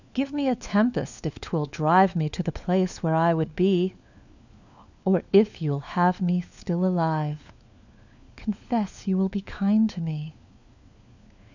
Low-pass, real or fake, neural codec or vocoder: 7.2 kHz; fake; codec, 16 kHz, 4 kbps, FunCodec, trained on LibriTTS, 50 frames a second